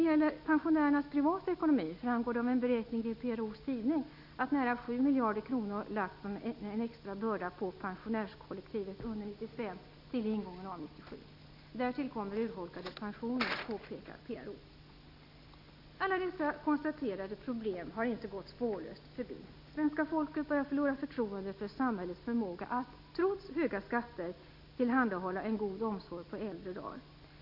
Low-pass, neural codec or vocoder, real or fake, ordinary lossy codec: 5.4 kHz; none; real; none